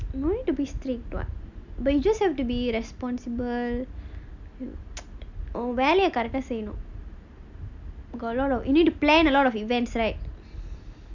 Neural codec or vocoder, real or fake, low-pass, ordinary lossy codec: none; real; 7.2 kHz; none